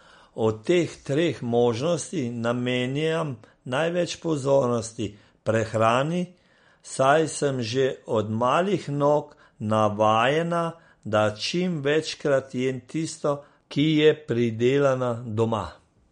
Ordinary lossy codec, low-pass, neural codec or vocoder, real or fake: MP3, 48 kbps; 9.9 kHz; none; real